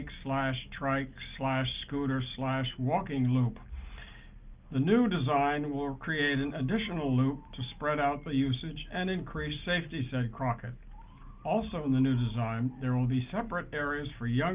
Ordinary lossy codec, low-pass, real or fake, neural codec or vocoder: Opus, 32 kbps; 3.6 kHz; real; none